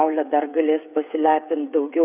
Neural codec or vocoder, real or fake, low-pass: codec, 16 kHz, 8 kbps, FreqCodec, smaller model; fake; 3.6 kHz